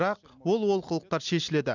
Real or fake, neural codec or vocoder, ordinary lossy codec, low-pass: real; none; none; 7.2 kHz